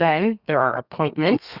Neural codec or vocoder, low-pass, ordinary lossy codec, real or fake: codec, 32 kHz, 1.9 kbps, SNAC; 5.4 kHz; Opus, 64 kbps; fake